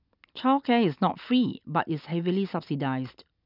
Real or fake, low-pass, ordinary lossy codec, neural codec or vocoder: real; 5.4 kHz; none; none